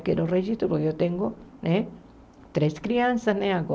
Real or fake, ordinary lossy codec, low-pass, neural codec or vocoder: real; none; none; none